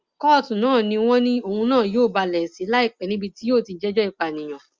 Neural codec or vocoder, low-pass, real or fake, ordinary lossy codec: none; 7.2 kHz; real; Opus, 32 kbps